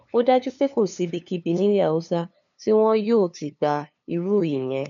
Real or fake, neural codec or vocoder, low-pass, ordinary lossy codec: fake; codec, 16 kHz, 2 kbps, FunCodec, trained on LibriTTS, 25 frames a second; 7.2 kHz; none